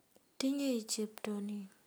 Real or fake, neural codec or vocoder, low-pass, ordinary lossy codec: real; none; none; none